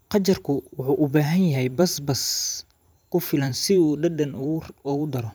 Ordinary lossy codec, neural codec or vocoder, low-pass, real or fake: none; vocoder, 44.1 kHz, 128 mel bands every 512 samples, BigVGAN v2; none; fake